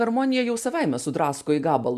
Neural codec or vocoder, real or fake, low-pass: none; real; 14.4 kHz